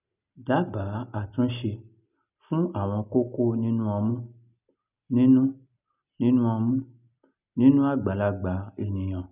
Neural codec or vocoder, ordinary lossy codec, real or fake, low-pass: none; none; real; 3.6 kHz